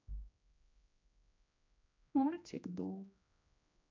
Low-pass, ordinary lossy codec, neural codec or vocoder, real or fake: 7.2 kHz; MP3, 64 kbps; codec, 16 kHz, 1 kbps, X-Codec, HuBERT features, trained on general audio; fake